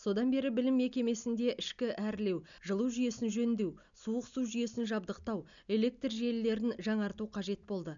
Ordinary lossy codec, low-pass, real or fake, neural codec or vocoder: none; 7.2 kHz; real; none